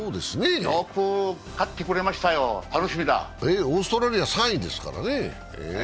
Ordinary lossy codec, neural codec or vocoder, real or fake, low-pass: none; none; real; none